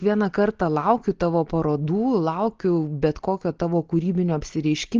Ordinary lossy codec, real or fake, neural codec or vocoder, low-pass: Opus, 16 kbps; real; none; 7.2 kHz